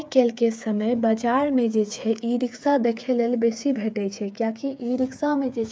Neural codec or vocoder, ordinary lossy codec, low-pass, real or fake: codec, 16 kHz, 8 kbps, FreqCodec, smaller model; none; none; fake